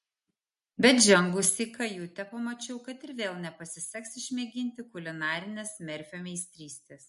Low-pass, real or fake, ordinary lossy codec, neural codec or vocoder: 14.4 kHz; real; MP3, 48 kbps; none